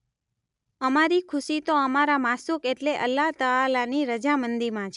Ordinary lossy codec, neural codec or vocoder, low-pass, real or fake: none; none; 10.8 kHz; real